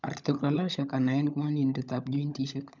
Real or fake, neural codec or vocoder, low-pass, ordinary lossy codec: fake; codec, 16 kHz, 16 kbps, FunCodec, trained on LibriTTS, 50 frames a second; 7.2 kHz; none